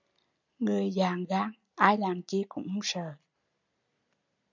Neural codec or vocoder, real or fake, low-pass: none; real; 7.2 kHz